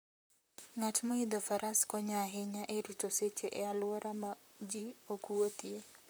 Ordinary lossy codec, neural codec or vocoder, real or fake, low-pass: none; vocoder, 44.1 kHz, 128 mel bands, Pupu-Vocoder; fake; none